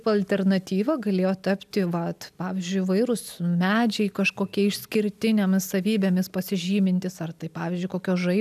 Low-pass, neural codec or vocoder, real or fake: 14.4 kHz; none; real